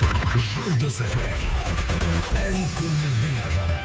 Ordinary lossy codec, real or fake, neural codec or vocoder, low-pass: none; fake; codec, 16 kHz, 2 kbps, FunCodec, trained on Chinese and English, 25 frames a second; none